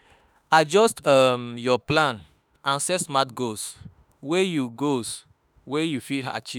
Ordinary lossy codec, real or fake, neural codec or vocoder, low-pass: none; fake; autoencoder, 48 kHz, 32 numbers a frame, DAC-VAE, trained on Japanese speech; none